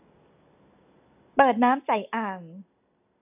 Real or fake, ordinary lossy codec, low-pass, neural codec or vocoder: real; AAC, 32 kbps; 3.6 kHz; none